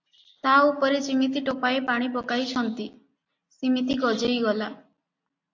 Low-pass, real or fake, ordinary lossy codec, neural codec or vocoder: 7.2 kHz; real; AAC, 48 kbps; none